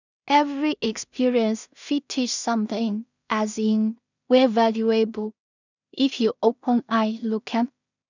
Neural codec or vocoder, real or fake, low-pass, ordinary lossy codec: codec, 16 kHz in and 24 kHz out, 0.4 kbps, LongCat-Audio-Codec, two codebook decoder; fake; 7.2 kHz; none